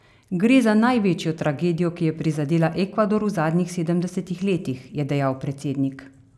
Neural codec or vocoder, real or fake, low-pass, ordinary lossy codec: none; real; none; none